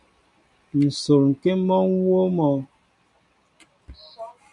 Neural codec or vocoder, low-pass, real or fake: none; 10.8 kHz; real